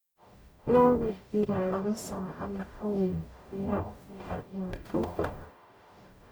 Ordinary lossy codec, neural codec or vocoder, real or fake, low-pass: none; codec, 44.1 kHz, 0.9 kbps, DAC; fake; none